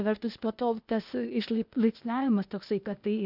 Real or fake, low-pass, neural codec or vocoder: fake; 5.4 kHz; codec, 16 kHz, 0.8 kbps, ZipCodec